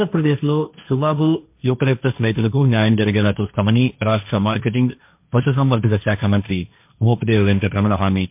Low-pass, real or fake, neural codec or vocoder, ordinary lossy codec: 3.6 kHz; fake; codec, 16 kHz, 1.1 kbps, Voila-Tokenizer; MP3, 32 kbps